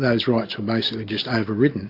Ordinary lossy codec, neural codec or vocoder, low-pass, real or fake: AAC, 32 kbps; none; 5.4 kHz; real